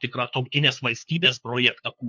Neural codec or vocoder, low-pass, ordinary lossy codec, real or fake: codec, 16 kHz, 2 kbps, FunCodec, trained on LibriTTS, 25 frames a second; 7.2 kHz; MP3, 64 kbps; fake